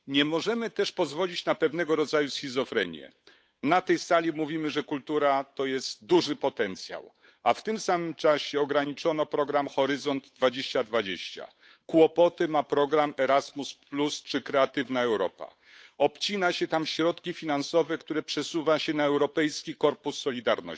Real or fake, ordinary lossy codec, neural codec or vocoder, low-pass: fake; none; codec, 16 kHz, 8 kbps, FunCodec, trained on Chinese and English, 25 frames a second; none